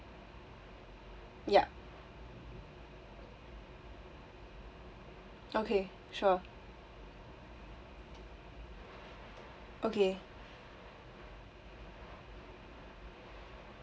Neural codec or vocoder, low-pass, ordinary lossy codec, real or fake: none; none; none; real